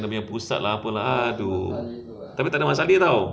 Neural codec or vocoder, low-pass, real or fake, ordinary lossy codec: none; none; real; none